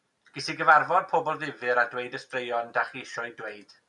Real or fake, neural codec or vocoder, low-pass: real; none; 10.8 kHz